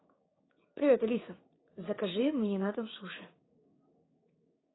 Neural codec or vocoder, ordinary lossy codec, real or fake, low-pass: codec, 16 kHz, 6 kbps, DAC; AAC, 16 kbps; fake; 7.2 kHz